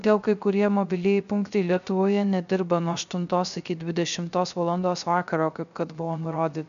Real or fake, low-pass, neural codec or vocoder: fake; 7.2 kHz; codec, 16 kHz, 0.3 kbps, FocalCodec